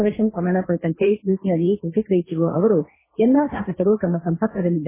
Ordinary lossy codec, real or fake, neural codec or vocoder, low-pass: MP3, 16 kbps; fake; codec, 16 kHz, 1.1 kbps, Voila-Tokenizer; 3.6 kHz